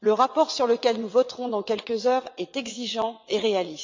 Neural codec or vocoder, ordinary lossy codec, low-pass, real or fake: vocoder, 22.05 kHz, 80 mel bands, WaveNeXt; MP3, 64 kbps; 7.2 kHz; fake